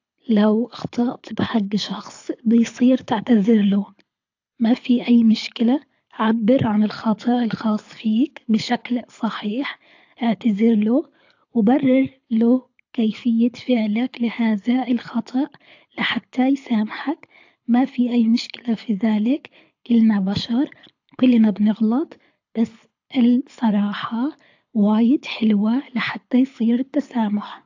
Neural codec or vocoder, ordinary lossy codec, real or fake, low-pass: codec, 24 kHz, 6 kbps, HILCodec; AAC, 48 kbps; fake; 7.2 kHz